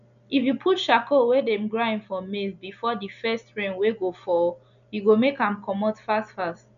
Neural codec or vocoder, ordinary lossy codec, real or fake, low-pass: none; none; real; 7.2 kHz